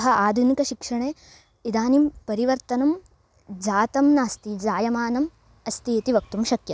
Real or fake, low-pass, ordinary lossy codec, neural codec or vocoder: real; none; none; none